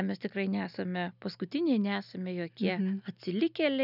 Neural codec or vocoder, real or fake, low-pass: none; real; 5.4 kHz